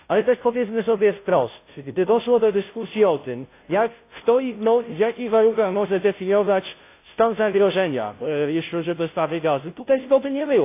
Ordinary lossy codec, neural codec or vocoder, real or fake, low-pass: AAC, 24 kbps; codec, 16 kHz, 0.5 kbps, FunCodec, trained on Chinese and English, 25 frames a second; fake; 3.6 kHz